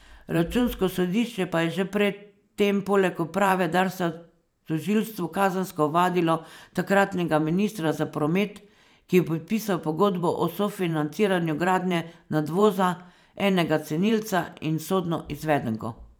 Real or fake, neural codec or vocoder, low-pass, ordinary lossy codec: fake; vocoder, 44.1 kHz, 128 mel bands every 512 samples, BigVGAN v2; none; none